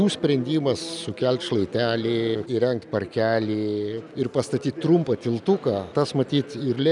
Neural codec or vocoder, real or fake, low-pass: none; real; 10.8 kHz